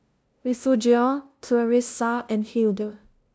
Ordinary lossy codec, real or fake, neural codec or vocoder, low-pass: none; fake; codec, 16 kHz, 0.5 kbps, FunCodec, trained on LibriTTS, 25 frames a second; none